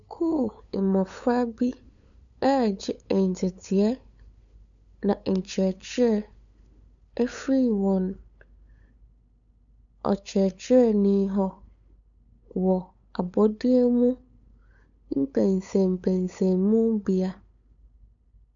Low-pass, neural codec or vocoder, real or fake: 7.2 kHz; codec, 16 kHz, 8 kbps, FunCodec, trained on LibriTTS, 25 frames a second; fake